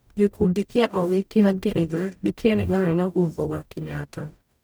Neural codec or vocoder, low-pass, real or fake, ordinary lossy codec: codec, 44.1 kHz, 0.9 kbps, DAC; none; fake; none